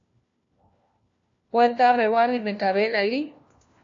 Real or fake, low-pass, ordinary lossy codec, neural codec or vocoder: fake; 7.2 kHz; AAC, 48 kbps; codec, 16 kHz, 1 kbps, FunCodec, trained on LibriTTS, 50 frames a second